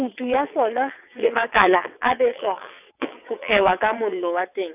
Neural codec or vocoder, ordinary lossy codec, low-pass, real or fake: vocoder, 22.05 kHz, 80 mel bands, WaveNeXt; none; 3.6 kHz; fake